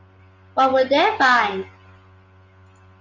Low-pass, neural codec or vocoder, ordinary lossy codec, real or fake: 7.2 kHz; none; Opus, 32 kbps; real